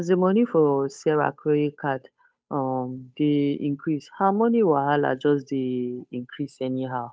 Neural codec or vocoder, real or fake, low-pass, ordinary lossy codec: codec, 16 kHz, 8 kbps, FunCodec, trained on Chinese and English, 25 frames a second; fake; none; none